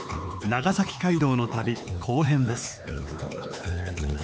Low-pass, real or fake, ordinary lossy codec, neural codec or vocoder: none; fake; none; codec, 16 kHz, 4 kbps, X-Codec, HuBERT features, trained on LibriSpeech